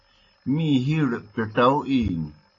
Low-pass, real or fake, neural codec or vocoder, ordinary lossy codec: 7.2 kHz; real; none; AAC, 32 kbps